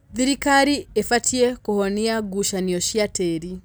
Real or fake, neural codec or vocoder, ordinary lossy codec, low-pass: real; none; none; none